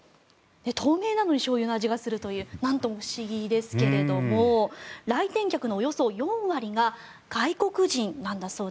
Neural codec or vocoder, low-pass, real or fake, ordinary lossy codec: none; none; real; none